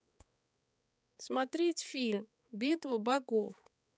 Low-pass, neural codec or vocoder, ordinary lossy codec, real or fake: none; codec, 16 kHz, 4 kbps, X-Codec, HuBERT features, trained on balanced general audio; none; fake